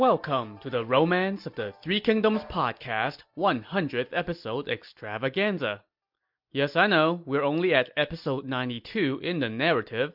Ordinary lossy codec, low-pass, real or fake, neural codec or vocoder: MP3, 48 kbps; 5.4 kHz; real; none